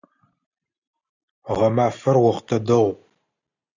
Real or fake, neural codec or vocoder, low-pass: real; none; 7.2 kHz